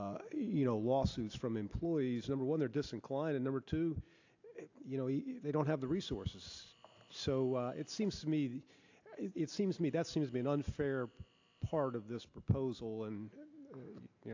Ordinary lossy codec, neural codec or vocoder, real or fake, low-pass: AAC, 48 kbps; none; real; 7.2 kHz